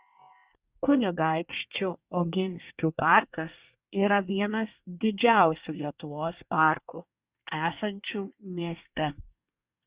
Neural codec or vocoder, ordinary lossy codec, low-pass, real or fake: codec, 24 kHz, 1 kbps, SNAC; Opus, 64 kbps; 3.6 kHz; fake